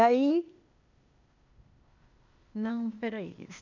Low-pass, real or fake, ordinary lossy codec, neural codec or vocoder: 7.2 kHz; fake; none; codec, 16 kHz, 0.8 kbps, ZipCodec